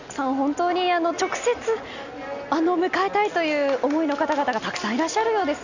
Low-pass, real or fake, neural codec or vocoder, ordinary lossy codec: 7.2 kHz; real; none; none